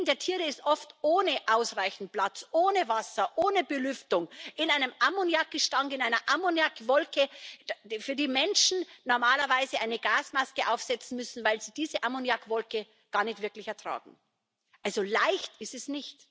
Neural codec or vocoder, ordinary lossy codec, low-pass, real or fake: none; none; none; real